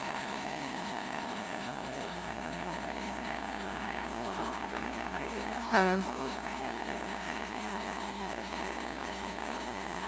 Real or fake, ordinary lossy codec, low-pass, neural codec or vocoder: fake; none; none; codec, 16 kHz, 0.5 kbps, FunCodec, trained on LibriTTS, 25 frames a second